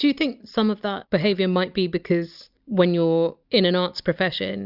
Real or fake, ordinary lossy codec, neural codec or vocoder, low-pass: real; Opus, 64 kbps; none; 5.4 kHz